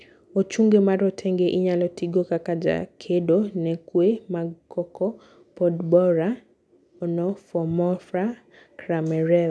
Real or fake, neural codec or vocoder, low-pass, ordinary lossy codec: real; none; none; none